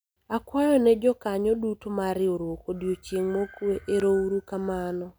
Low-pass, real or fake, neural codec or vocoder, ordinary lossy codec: none; real; none; none